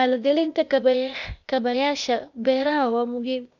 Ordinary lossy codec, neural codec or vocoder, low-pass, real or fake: none; codec, 16 kHz, 0.8 kbps, ZipCodec; 7.2 kHz; fake